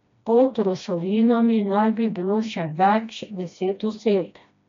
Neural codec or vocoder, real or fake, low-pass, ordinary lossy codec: codec, 16 kHz, 1 kbps, FreqCodec, smaller model; fake; 7.2 kHz; MP3, 64 kbps